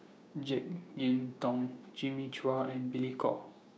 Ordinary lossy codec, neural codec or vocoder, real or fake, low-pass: none; codec, 16 kHz, 6 kbps, DAC; fake; none